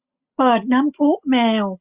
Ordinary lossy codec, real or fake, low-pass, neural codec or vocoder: Opus, 64 kbps; real; 3.6 kHz; none